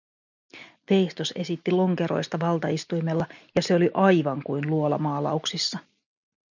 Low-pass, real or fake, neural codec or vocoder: 7.2 kHz; real; none